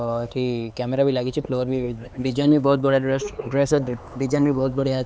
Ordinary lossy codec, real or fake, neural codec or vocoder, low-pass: none; fake; codec, 16 kHz, 4 kbps, X-Codec, HuBERT features, trained on LibriSpeech; none